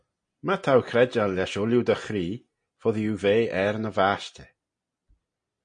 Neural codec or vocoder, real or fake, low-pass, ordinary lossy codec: none; real; 9.9 kHz; MP3, 48 kbps